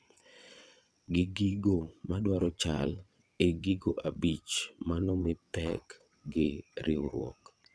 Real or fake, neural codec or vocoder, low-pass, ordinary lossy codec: fake; vocoder, 22.05 kHz, 80 mel bands, WaveNeXt; none; none